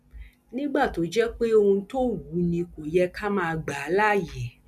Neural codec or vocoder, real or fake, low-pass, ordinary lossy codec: none; real; 14.4 kHz; none